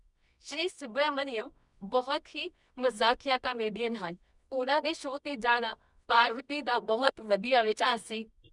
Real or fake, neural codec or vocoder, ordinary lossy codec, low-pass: fake; codec, 24 kHz, 0.9 kbps, WavTokenizer, medium music audio release; none; 10.8 kHz